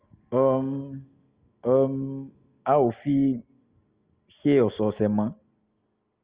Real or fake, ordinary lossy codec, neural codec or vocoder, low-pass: real; Opus, 32 kbps; none; 3.6 kHz